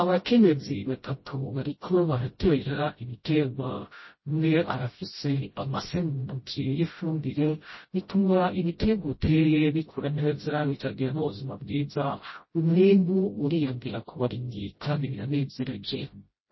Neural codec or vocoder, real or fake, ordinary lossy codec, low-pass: codec, 16 kHz, 0.5 kbps, FreqCodec, smaller model; fake; MP3, 24 kbps; 7.2 kHz